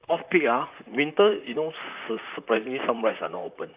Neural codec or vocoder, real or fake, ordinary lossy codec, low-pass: vocoder, 44.1 kHz, 128 mel bands, Pupu-Vocoder; fake; Opus, 64 kbps; 3.6 kHz